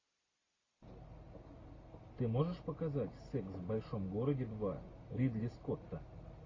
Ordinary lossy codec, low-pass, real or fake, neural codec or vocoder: AAC, 32 kbps; 7.2 kHz; real; none